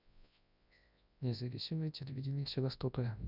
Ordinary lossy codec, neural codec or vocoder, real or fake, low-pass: none; codec, 24 kHz, 0.9 kbps, WavTokenizer, large speech release; fake; 5.4 kHz